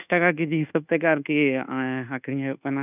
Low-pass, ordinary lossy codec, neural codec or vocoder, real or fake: 3.6 kHz; none; codec, 24 kHz, 1.2 kbps, DualCodec; fake